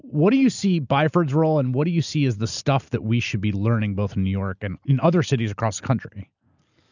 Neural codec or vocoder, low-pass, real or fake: none; 7.2 kHz; real